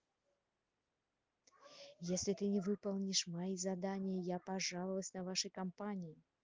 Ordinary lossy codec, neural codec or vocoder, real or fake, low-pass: Opus, 32 kbps; none; real; 7.2 kHz